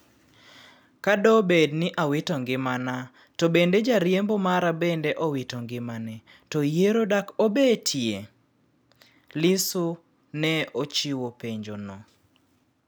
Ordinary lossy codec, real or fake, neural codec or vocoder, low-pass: none; real; none; none